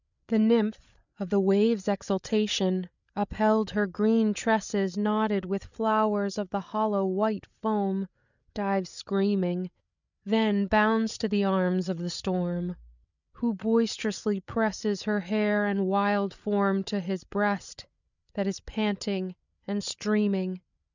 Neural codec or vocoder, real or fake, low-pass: codec, 16 kHz, 8 kbps, FreqCodec, larger model; fake; 7.2 kHz